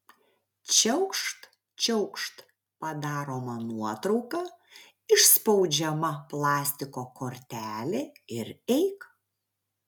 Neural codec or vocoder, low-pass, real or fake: none; 19.8 kHz; real